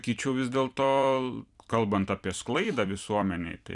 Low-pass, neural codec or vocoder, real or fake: 10.8 kHz; vocoder, 44.1 kHz, 128 mel bands every 256 samples, BigVGAN v2; fake